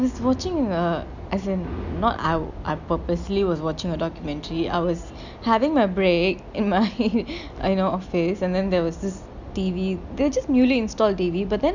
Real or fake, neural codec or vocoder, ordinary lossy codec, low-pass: real; none; none; 7.2 kHz